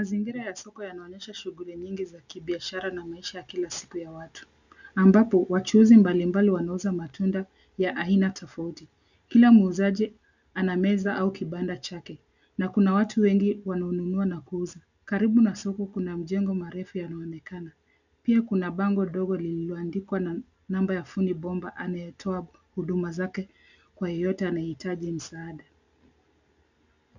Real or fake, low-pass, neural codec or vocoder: real; 7.2 kHz; none